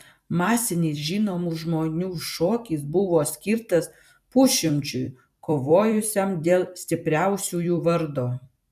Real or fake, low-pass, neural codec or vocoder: fake; 14.4 kHz; vocoder, 44.1 kHz, 128 mel bands every 256 samples, BigVGAN v2